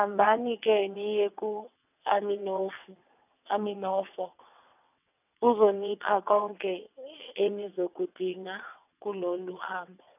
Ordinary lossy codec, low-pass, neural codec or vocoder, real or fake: none; 3.6 kHz; vocoder, 22.05 kHz, 80 mel bands, WaveNeXt; fake